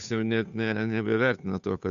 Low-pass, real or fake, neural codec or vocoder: 7.2 kHz; fake; codec, 16 kHz, 2 kbps, FunCodec, trained on Chinese and English, 25 frames a second